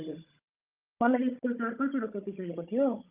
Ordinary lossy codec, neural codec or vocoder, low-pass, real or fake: Opus, 24 kbps; codec, 16 kHz, 16 kbps, FunCodec, trained on Chinese and English, 50 frames a second; 3.6 kHz; fake